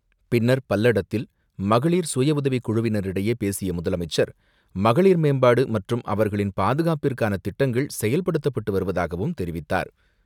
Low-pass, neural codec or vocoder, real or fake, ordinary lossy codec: 19.8 kHz; none; real; none